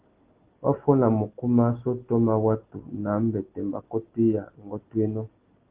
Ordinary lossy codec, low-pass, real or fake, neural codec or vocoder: Opus, 16 kbps; 3.6 kHz; real; none